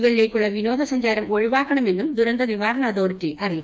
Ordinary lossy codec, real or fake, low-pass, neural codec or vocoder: none; fake; none; codec, 16 kHz, 2 kbps, FreqCodec, smaller model